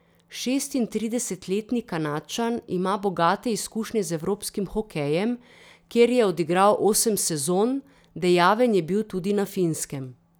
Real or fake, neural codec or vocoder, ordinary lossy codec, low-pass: real; none; none; none